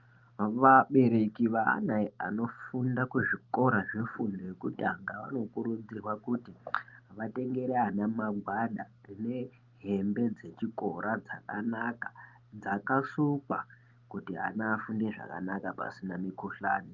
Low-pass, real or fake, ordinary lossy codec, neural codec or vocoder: 7.2 kHz; real; Opus, 32 kbps; none